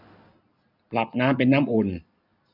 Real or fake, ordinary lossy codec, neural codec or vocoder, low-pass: real; none; none; 5.4 kHz